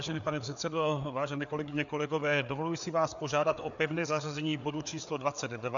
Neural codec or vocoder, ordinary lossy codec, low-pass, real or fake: codec, 16 kHz, 4 kbps, FreqCodec, larger model; MP3, 96 kbps; 7.2 kHz; fake